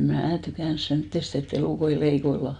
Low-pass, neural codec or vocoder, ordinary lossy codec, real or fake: 9.9 kHz; none; none; real